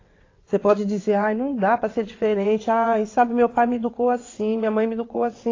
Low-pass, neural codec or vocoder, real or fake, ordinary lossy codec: 7.2 kHz; vocoder, 22.05 kHz, 80 mel bands, WaveNeXt; fake; AAC, 32 kbps